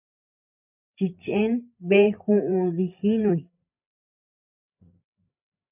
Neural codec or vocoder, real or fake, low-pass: codec, 16 kHz, 8 kbps, FreqCodec, larger model; fake; 3.6 kHz